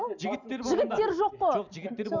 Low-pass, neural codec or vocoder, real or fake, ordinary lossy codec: 7.2 kHz; none; real; none